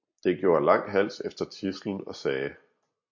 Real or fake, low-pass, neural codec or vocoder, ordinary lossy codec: real; 7.2 kHz; none; MP3, 48 kbps